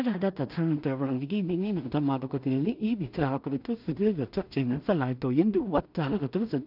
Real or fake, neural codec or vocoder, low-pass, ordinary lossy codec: fake; codec, 16 kHz in and 24 kHz out, 0.4 kbps, LongCat-Audio-Codec, two codebook decoder; 5.4 kHz; none